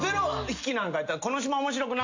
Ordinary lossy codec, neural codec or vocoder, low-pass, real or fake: AAC, 48 kbps; none; 7.2 kHz; real